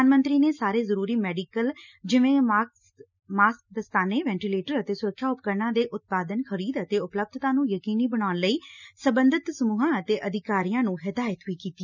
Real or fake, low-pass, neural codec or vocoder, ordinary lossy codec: real; 7.2 kHz; none; none